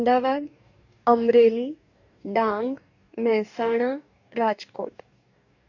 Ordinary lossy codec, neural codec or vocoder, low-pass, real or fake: none; codec, 44.1 kHz, 2.6 kbps, DAC; 7.2 kHz; fake